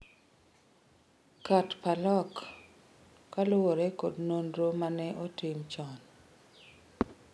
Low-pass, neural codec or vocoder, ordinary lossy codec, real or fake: none; none; none; real